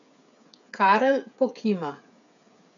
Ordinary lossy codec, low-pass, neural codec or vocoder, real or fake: none; 7.2 kHz; codec, 16 kHz, 8 kbps, FreqCodec, smaller model; fake